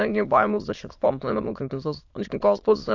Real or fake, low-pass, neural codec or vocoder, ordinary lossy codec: fake; 7.2 kHz; autoencoder, 22.05 kHz, a latent of 192 numbers a frame, VITS, trained on many speakers; AAC, 48 kbps